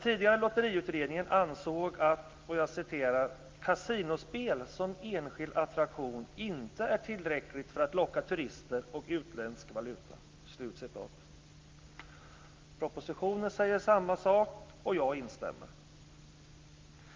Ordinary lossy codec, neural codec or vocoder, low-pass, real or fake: Opus, 32 kbps; none; 7.2 kHz; real